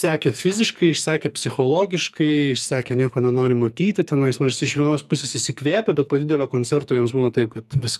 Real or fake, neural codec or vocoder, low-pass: fake; codec, 44.1 kHz, 2.6 kbps, SNAC; 14.4 kHz